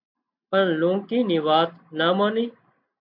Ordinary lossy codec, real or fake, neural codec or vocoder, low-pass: MP3, 48 kbps; real; none; 5.4 kHz